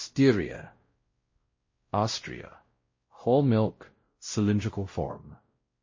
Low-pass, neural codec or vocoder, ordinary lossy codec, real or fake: 7.2 kHz; codec, 16 kHz, 0.5 kbps, X-Codec, WavLM features, trained on Multilingual LibriSpeech; MP3, 32 kbps; fake